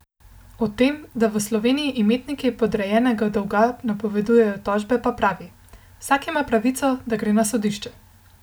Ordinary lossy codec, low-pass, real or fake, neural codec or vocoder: none; none; real; none